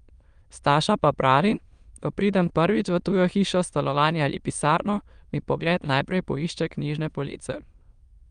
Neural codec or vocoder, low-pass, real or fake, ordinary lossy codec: autoencoder, 22.05 kHz, a latent of 192 numbers a frame, VITS, trained on many speakers; 9.9 kHz; fake; Opus, 32 kbps